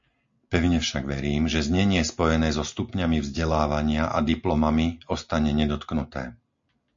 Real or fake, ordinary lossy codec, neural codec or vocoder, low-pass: real; MP3, 48 kbps; none; 7.2 kHz